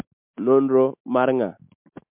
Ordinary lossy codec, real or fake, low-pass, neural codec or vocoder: MP3, 32 kbps; real; 3.6 kHz; none